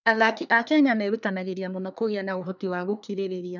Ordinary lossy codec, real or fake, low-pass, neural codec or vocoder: none; fake; 7.2 kHz; codec, 24 kHz, 1 kbps, SNAC